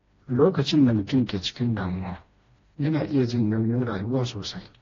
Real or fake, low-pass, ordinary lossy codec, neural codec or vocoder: fake; 7.2 kHz; AAC, 32 kbps; codec, 16 kHz, 1 kbps, FreqCodec, smaller model